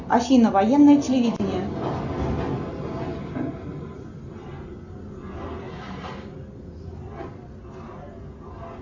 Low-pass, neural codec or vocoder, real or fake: 7.2 kHz; none; real